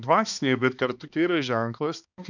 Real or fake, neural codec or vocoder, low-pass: fake; codec, 16 kHz, 2 kbps, X-Codec, HuBERT features, trained on balanced general audio; 7.2 kHz